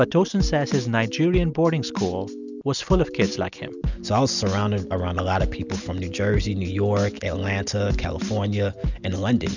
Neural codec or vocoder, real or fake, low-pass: none; real; 7.2 kHz